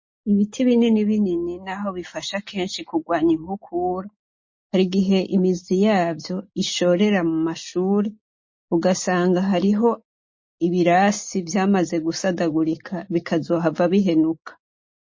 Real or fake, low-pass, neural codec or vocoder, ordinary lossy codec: real; 7.2 kHz; none; MP3, 32 kbps